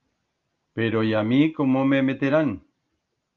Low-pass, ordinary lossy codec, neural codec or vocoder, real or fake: 7.2 kHz; Opus, 32 kbps; none; real